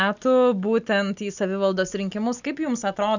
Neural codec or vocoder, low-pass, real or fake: none; 7.2 kHz; real